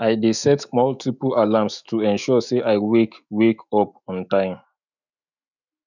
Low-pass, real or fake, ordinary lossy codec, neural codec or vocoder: 7.2 kHz; fake; none; autoencoder, 48 kHz, 128 numbers a frame, DAC-VAE, trained on Japanese speech